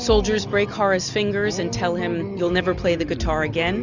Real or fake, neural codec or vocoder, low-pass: real; none; 7.2 kHz